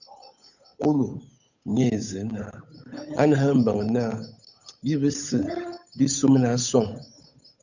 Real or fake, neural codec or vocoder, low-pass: fake; codec, 16 kHz, 8 kbps, FunCodec, trained on Chinese and English, 25 frames a second; 7.2 kHz